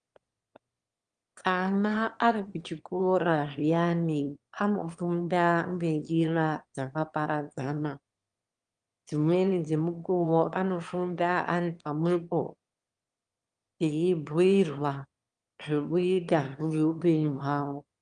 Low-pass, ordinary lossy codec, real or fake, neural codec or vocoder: 9.9 kHz; Opus, 32 kbps; fake; autoencoder, 22.05 kHz, a latent of 192 numbers a frame, VITS, trained on one speaker